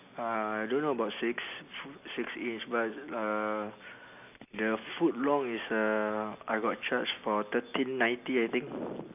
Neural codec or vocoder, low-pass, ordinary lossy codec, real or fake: none; 3.6 kHz; none; real